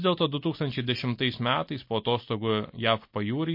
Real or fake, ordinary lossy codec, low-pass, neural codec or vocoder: real; MP3, 32 kbps; 5.4 kHz; none